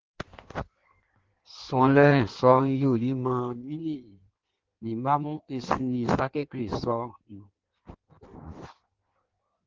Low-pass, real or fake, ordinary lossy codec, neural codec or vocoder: 7.2 kHz; fake; Opus, 16 kbps; codec, 16 kHz in and 24 kHz out, 1.1 kbps, FireRedTTS-2 codec